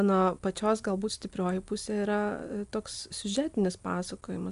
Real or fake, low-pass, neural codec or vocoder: real; 10.8 kHz; none